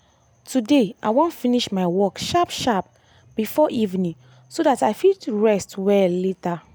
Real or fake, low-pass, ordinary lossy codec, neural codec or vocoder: real; none; none; none